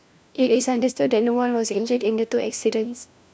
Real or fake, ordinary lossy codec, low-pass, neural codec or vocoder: fake; none; none; codec, 16 kHz, 1 kbps, FunCodec, trained on LibriTTS, 50 frames a second